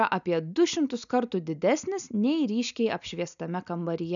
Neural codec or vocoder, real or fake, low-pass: none; real; 7.2 kHz